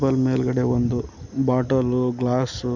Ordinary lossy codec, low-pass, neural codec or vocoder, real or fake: none; 7.2 kHz; none; real